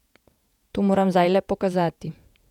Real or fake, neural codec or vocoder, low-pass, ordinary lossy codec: fake; vocoder, 48 kHz, 128 mel bands, Vocos; 19.8 kHz; none